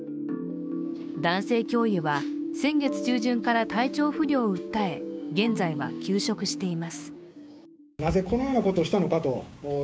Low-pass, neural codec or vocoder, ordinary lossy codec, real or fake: none; codec, 16 kHz, 6 kbps, DAC; none; fake